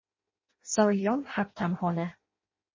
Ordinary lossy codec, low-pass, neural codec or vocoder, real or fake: MP3, 32 kbps; 7.2 kHz; codec, 16 kHz in and 24 kHz out, 0.6 kbps, FireRedTTS-2 codec; fake